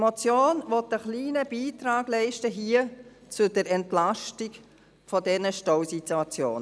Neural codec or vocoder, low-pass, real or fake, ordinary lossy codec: none; none; real; none